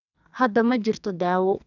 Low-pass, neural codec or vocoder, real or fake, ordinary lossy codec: 7.2 kHz; codec, 44.1 kHz, 2.6 kbps, SNAC; fake; none